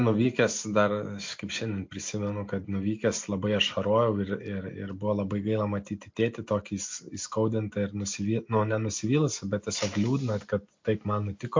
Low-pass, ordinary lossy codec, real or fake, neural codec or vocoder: 7.2 kHz; MP3, 48 kbps; real; none